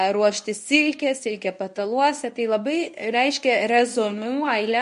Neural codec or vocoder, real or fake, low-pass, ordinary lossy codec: codec, 24 kHz, 0.9 kbps, WavTokenizer, medium speech release version 1; fake; 10.8 kHz; MP3, 48 kbps